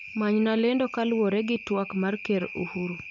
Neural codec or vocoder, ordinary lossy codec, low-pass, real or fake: none; none; 7.2 kHz; real